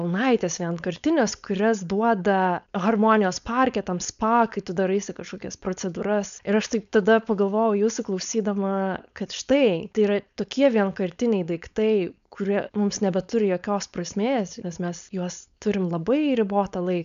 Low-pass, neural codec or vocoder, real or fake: 7.2 kHz; codec, 16 kHz, 4.8 kbps, FACodec; fake